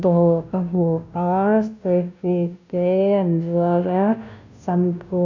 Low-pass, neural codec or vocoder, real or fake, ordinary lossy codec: 7.2 kHz; codec, 16 kHz, 0.5 kbps, FunCodec, trained on Chinese and English, 25 frames a second; fake; none